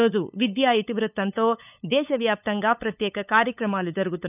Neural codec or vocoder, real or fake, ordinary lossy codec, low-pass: codec, 16 kHz, 8 kbps, FunCodec, trained on LibriTTS, 25 frames a second; fake; none; 3.6 kHz